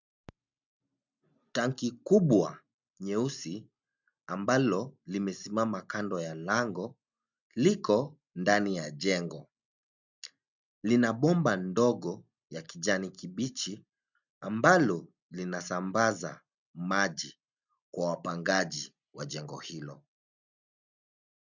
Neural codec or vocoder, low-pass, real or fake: none; 7.2 kHz; real